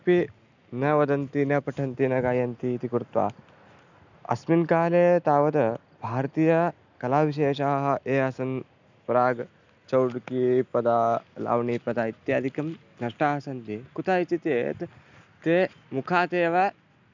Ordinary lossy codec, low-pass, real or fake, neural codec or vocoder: none; 7.2 kHz; real; none